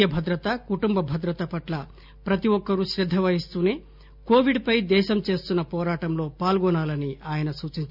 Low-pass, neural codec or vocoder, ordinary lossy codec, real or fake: 5.4 kHz; none; none; real